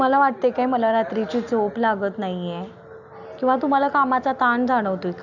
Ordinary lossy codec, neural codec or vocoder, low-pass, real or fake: none; none; 7.2 kHz; real